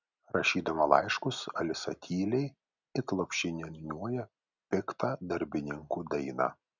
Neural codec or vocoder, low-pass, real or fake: none; 7.2 kHz; real